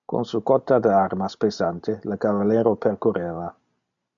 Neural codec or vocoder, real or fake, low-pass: none; real; 7.2 kHz